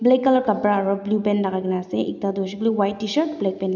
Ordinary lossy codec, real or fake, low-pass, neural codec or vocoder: none; real; 7.2 kHz; none